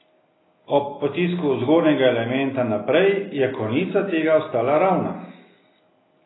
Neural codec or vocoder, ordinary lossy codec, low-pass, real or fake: none; AAC, 16 kbps; 7.2 kHz; real